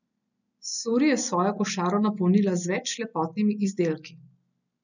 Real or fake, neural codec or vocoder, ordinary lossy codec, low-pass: real; none; none; 7.2 kHz